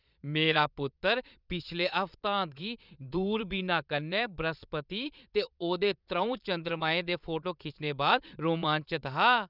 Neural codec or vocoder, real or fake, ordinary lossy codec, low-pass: vocoder, 22.05 kHz, 80 mel bands, Vocos; fake; none; 5.4 kHz